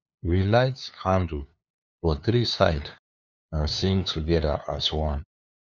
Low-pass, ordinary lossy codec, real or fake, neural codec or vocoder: 7.2 kHz; none; fake; codec, 16 kHz, 2 kbps, FunCodec, trained on LibriTTS, 25 frames a second